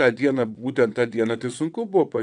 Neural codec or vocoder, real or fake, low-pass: vocoder, 22.05 kHz, 80 mel bands, WaveNeXt; fake; 9.9 kHz